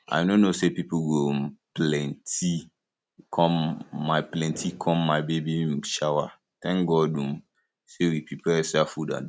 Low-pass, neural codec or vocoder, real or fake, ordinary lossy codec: none; none; real; none